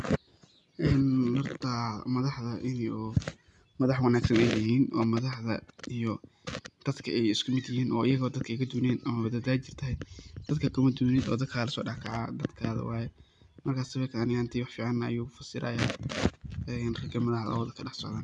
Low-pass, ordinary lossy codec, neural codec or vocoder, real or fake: 10.8 kHz; none; none; real